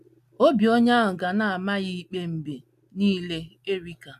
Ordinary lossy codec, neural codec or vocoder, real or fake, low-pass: AAC, 96 kbps; none; real; 14.4 kHz